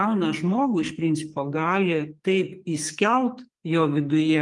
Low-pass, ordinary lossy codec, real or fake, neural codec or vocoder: 10.8 kHz; Opus, 32 kbps; fake; codec, 44.1 kHz, 2.6 kbps, SNAC